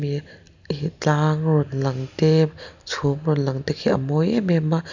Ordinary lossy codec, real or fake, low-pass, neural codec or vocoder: none; real; 7.2 kHz; none